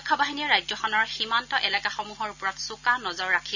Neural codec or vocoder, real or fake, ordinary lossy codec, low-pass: none; real; none; 7.2 kHz